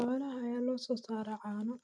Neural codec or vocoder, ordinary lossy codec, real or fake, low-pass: none; none; real; 9.9 kHz